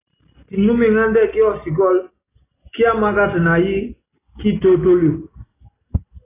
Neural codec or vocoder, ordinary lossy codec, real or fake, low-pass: none; AAC, 16 kbps; real; 3.6 kHz